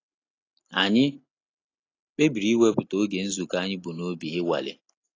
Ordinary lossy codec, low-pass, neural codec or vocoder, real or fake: AAC, 32 kbps; 7.2 kHz; none; real